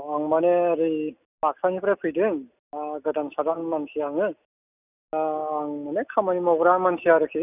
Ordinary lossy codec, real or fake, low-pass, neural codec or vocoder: none; real; 3.6 kHz; none